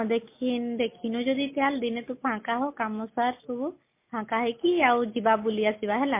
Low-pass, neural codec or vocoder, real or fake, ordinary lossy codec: 3.6 kHz; none; real; MP3, 24 kbps